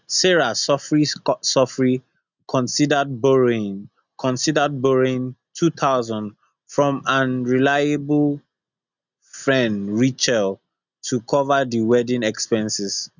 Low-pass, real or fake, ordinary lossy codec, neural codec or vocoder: 7.2 kHz; real; none; none